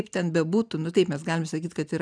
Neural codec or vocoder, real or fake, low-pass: none; real; 9.9 kHz